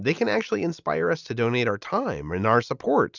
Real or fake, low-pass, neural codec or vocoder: real; 7.2 kHz; none